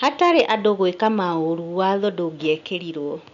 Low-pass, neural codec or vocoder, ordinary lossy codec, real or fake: 7.2 kHz; none; MP3, 96 kbps; real